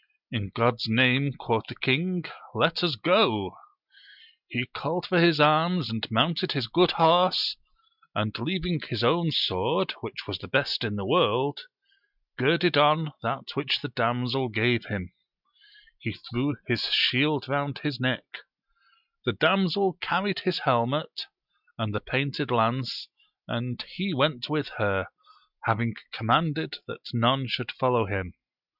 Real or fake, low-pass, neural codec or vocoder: real; 5.4 kHz; none